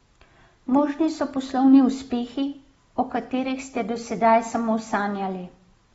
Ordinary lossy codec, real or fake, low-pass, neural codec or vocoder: AAC, 24 kbps; real; 19.8 kHz; none